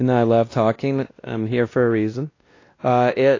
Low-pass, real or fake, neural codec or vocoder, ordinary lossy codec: 7.2 kHz; fake; codec, 16 kHz, 1 kbps, X-Codec, WavLM features, trained on Multilingual LibriSpeech; AAC, 32 kbps